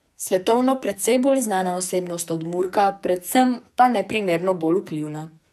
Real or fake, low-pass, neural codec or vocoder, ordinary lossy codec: fake; 14.4 kHz; codec, 44.1 kHz, 2.6 kbps, SNAC; AAC, 96 kbps